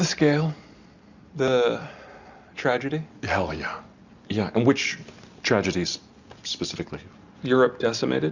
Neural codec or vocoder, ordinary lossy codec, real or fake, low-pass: none; Opus, 64 kbps; real; 7.2 kHz